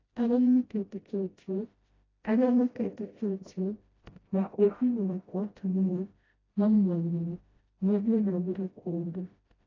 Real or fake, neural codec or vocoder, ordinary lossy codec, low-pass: fake; codec, 16 kHz, 0.5 kbps, FreqCodec, smaller model; AAC, 32 kbps; 7.2 kHz